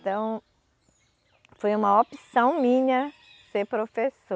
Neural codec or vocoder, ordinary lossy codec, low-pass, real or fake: none; none; none; real